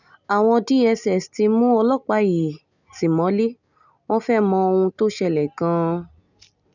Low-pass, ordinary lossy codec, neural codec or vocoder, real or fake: 7.2 kHz; none; none; real